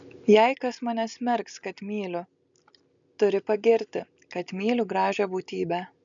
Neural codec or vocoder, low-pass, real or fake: none; 7.2 kHz; real